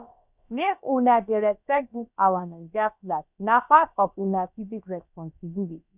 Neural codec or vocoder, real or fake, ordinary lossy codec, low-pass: codec, 16 kHz, about 1 kbps, DyCAST, with the encoder's durations; fake; none; 3.6 kHz